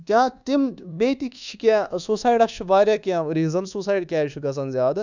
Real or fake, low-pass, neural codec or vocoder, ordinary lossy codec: fake; 7.2 kHz; codec, 24 kHz, 1.2 kbps, DualCodec; none